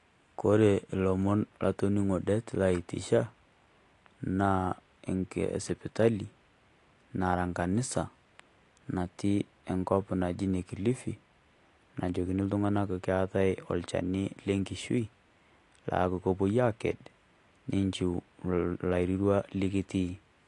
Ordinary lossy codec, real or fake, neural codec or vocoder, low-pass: AAC, 48 kbps; real; none; 10.8 kHz